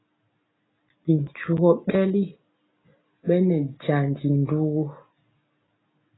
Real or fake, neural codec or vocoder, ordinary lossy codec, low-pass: real; none; AAC, 16 kbps; 7.2 kHz